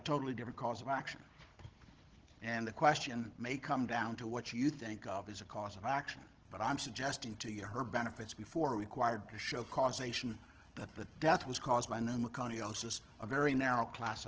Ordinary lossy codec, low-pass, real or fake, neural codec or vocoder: Opus, 16 kbps; 7.2 kHz; fake; codec, 16 kHz, 16 kbps, FunCodec, trained on Chinese and English, 50 frames a second